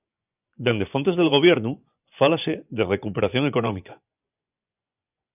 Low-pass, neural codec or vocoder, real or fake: 3.6 kHz; vocoder, 24 kHz, 100 mel bands, Vocos; fake